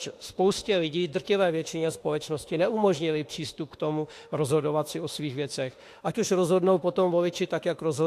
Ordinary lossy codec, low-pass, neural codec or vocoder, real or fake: AAC, 64 kbps; 14.4 kHz; autoencoder, 48 kHz, 32 numbers a frame, DAC-VAE, trained on Japanese speech; fake